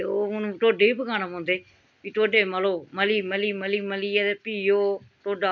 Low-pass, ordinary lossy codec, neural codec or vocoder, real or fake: 7.2 kHz; none; none; real